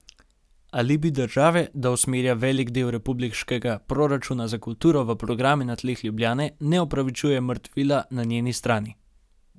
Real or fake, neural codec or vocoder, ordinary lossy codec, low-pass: real; none; none; none